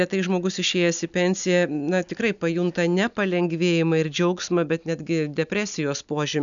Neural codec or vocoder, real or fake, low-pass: none; real; 7.2 kHz